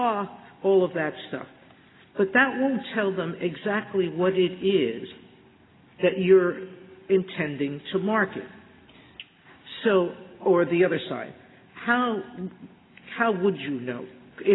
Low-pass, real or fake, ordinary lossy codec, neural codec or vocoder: 7.2 kHz; real; AAC, 16 kbps; none